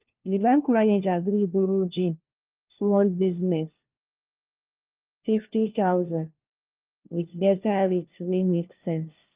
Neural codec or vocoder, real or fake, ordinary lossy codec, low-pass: codec, 16 kHz, 1 kbps, FunCodec, trained on LibriTTS, 50 frames a second; fake; Opus, 24 kbps; 3.6 kHz